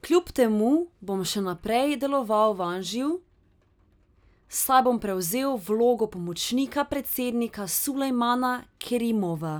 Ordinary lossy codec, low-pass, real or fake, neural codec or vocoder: none; none; real; none